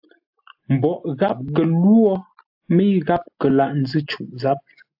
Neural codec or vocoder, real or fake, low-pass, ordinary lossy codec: none; real; 5.4 kHz; AAC, 48 kbps